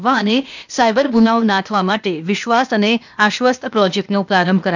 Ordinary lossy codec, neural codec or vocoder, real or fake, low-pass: none; codec, 16 kHz, 0.8 kbps, ZipCodec; fake; 7.2 kHz